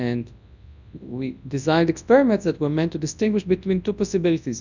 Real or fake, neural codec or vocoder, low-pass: fake; codec, 24 kHz, 0.9 kbps, WavTokenizer, large speech release; 7.2 kHz